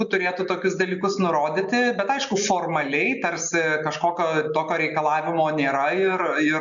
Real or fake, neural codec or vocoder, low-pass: real; none; 7.2 kHz